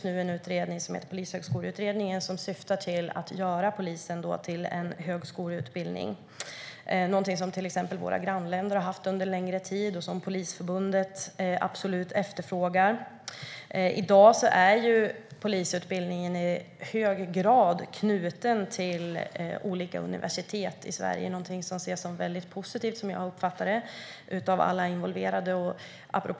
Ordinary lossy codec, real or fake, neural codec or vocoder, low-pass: none; real; none; none